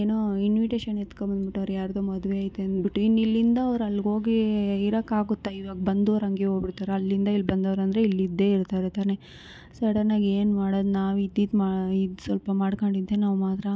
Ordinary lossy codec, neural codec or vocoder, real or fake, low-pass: none; none; real; none